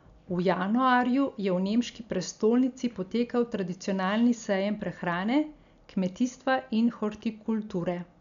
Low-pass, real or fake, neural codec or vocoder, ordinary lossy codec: 7.2 kHz; real; none; none